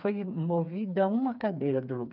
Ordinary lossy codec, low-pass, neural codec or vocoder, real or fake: none; 5.4 kHz; codec, 16 kHz, 4 kbps, FreqCodec, smaller model; fake